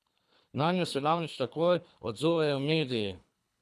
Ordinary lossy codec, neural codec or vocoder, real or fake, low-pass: none; codec, 24 kHz, 3 kbps, HILCodec; fake; none